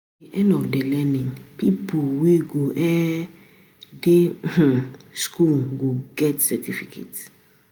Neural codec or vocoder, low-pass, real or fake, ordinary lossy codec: none; none; real; none